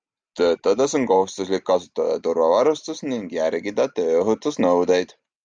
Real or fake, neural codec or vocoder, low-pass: real; none; 9.9 kHz